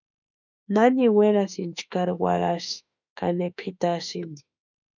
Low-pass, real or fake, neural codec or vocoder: 7.2 kHz; fake; autoencoder, 48 kHz, 32 numbers a frame, DAC-VAE, trained on Japanese speech